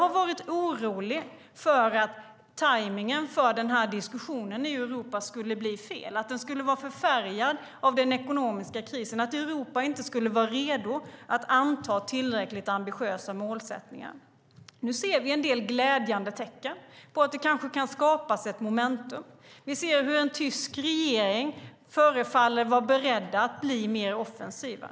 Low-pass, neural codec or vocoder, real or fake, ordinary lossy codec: none; none; real; none